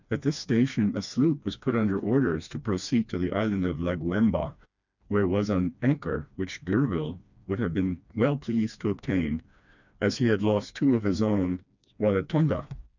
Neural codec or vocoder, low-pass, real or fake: codec, 16 kHz, 2 kbps, FreqCodec, smaller model; 7.2 kHz; fake